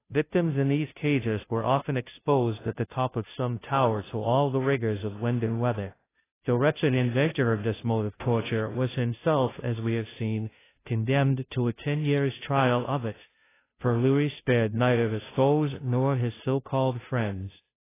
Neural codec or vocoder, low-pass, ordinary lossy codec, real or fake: codec, 16 kHz, 0.5 kbps, FunCodec, trained on Chinese and English, 25 frames a second; 3.6 kHz; AAC, 16 kbps; fake